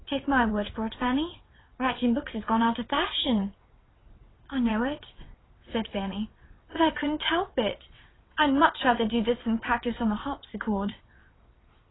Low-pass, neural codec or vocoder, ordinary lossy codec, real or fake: 7.2 kHz; vocoder, 22.05 kHz, 80 mel bands, WaveNeXt; AAC, 16 kbps; fake